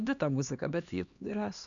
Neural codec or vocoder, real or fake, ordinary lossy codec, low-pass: codec, 16 kHz, 2 kbps, X-Codec, HuBERT features, trained on LibriSpeech; fake; AAC, 64 kbps; 7.2 kHz